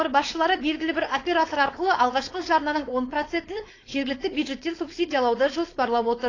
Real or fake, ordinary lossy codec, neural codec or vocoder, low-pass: fake; AAC, 32 kbps; codec, 16 kHz, 4.8 kbps, FACodec; 7.2 kHz